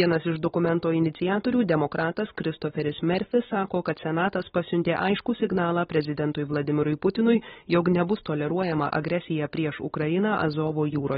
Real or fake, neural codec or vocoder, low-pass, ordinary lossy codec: real; none; 19.8 kHz; AAC, 16 kbps